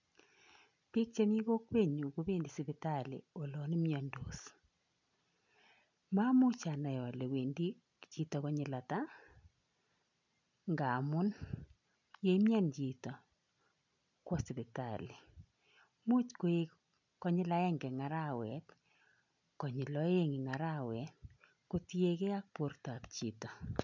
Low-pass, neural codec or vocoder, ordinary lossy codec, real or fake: 7.2 kHz; none; none; real